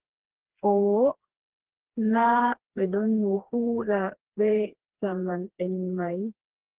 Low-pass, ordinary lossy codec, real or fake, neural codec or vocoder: 3.6 kHz; Opus, 16 kbps; fake; codec, 16 kHz, 2 kbps, FreqCodec, smaller model